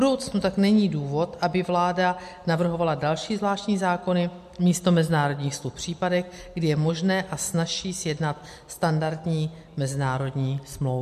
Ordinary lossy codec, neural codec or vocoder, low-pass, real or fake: MP3, 64 kbps; none; 14.4 kHz; real